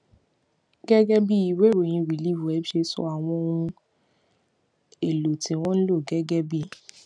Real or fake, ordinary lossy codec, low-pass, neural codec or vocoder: real; none; 9.9 kHz; none